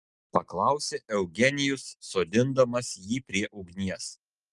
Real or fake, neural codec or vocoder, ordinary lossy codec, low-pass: real; none; Opus, 32 kbps; 10.8 kHz